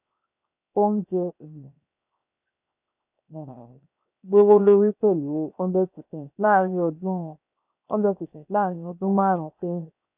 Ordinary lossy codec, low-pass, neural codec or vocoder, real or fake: none; 3.6 kHz; codec, 16 kHz, 0.7 kbps, FocalCodec; fake